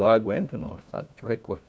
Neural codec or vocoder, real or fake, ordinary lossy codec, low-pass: codec, 16 kHz, 1 kbps, FunCodec, trained on LibriTTS, 50 frames a second; fake; none; none